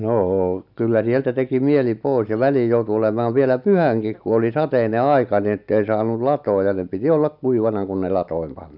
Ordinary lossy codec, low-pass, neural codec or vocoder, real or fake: none; 5.4 kHz; none; real